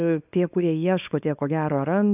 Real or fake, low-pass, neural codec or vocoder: fake; 3.6 kHz; codec, 24 kHz, 0.9 kbps, WavTokenizer, small release